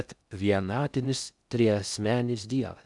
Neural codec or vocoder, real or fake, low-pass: codec, 16 kHz in and 24 kHz out, 0.6 kbps, FocalCodec, streaming, 2048 codes; fake; 10.8 kHz